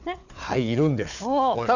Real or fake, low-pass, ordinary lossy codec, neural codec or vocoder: fake; 7.2 kHz; none; codec, 16 kHz, 4 kbps, FunCodec, trained on Chinese and English, 50 frames a second